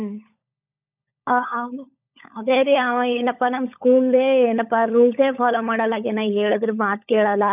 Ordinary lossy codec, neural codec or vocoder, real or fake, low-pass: none; codec, 16 kHz, 16 kbps, FunCodec, trained on LibriTTS, 50 frames a second; fake; 3.6 kHz